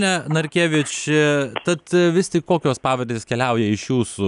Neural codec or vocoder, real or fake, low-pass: none; real; 10.8 kHz